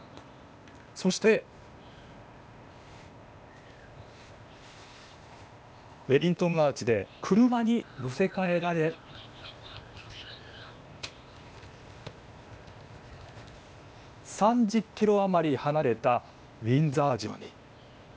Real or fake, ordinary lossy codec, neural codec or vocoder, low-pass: fake; none; codec, 16 kHz, 0.8 kbps, ZipCodec; none